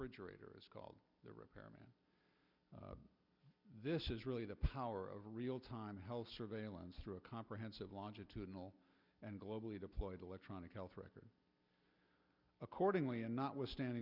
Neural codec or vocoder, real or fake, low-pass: none; real; 5.4 kHz